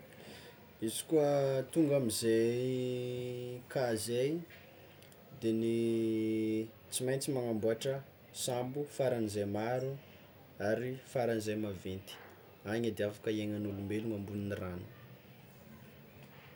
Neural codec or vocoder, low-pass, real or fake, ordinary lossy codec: none; none; real; none